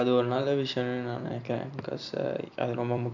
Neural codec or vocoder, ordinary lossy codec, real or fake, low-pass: vocoder, 44.1 kHz, 128 mel bands every 256 samples, BigVGAN v2; MP3, 64 kbps; fake; 7.2 kHz